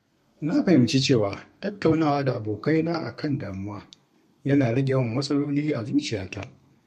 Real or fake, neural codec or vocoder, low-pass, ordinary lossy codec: fake; codec, 32 kHz, 1.9 kbps, SNAC; 14.4 kHz; MP3, 64 kbps